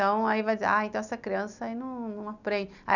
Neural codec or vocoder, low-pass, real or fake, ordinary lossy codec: none; 7.2 kHz; real; none